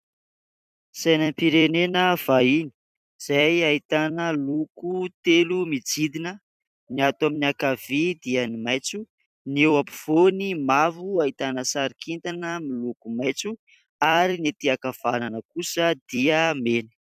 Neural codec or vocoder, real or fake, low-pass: vocoder, 44.1 kHz, 128 mel bands every 256 samples, BigVGAN v2; fake; 14.4 kHz